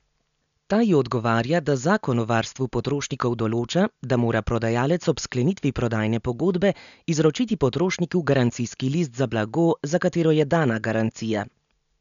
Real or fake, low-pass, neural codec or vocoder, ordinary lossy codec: real; 7.2 kHz; none; none